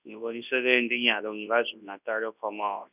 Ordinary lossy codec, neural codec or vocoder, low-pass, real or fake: none; codec, 24 kHz, 0.9 kbps, WavTokenizer, large speech release; 3.6 kHz; fake